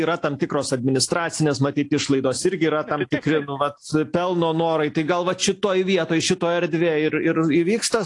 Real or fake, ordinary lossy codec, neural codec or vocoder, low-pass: real; AAC, 48 kbps; none; 10.8 kHz